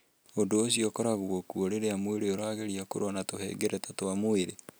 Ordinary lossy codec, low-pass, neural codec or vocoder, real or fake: none; none; none; real